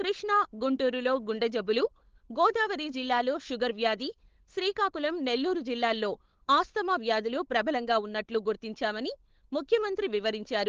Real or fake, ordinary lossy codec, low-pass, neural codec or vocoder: fake; Opus, 16 kbps; 7.2 kHz; codec, 16 kHz, 8 kbps, FunCodec, trained on Chinese and English, 25 frames a second